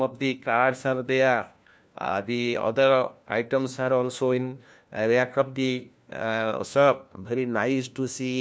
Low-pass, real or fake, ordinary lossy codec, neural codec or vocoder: none; fake; none; codec, 16 kHz, 1 kbps, FunCodec, trained on LibriTTS, 50 frames a second